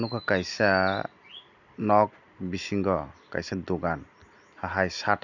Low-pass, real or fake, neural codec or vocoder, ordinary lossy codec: 7.2 kHz; real; none; none